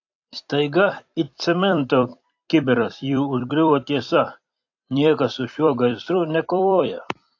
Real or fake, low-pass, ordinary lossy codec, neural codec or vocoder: fake; 7.2 kHz; AAC, 48 kbps; vocoder, 44.1 kHz, 128 mel bands every 256 samples, BigVGAN v2